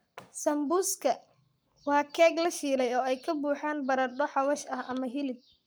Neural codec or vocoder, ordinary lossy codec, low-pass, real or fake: codec, 44.1 kHz, 7.8 kbps, Pupu-Codec; none; none; fake